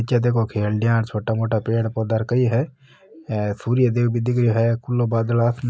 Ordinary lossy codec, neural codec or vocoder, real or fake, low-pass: none; none; real; none